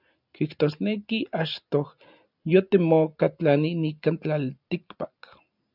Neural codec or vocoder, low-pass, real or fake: none; 5.4 kHz; real